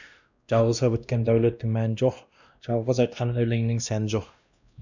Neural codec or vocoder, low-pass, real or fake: codec, 16 kHz, 1 kbps, X-Codec, WavLM features, trained on Multilingual LibriSpeech; 7.2 kHz; fake